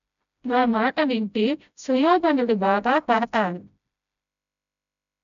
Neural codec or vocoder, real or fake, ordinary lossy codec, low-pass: codec, 16 kHz, 0.5 kbps, FreqCodec, smaller model; fake; none; 7.2 kHz